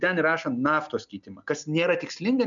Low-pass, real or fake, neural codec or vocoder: 7.2 kHz; real; none